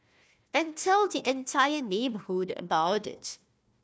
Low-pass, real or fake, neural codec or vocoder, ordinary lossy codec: none; fake; codec, 16 kHz, 1 kbps, FunCodec, trained on Chinese and English, 50 frames a second; none